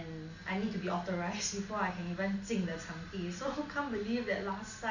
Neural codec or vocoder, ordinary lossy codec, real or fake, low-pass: none; none; real; 7.2 kHz